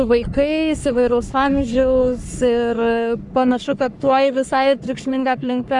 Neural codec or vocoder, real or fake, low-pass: codec, 44.1 kHz, 3.4 kbps, Pupu-Codec; fake; 10.8 kHz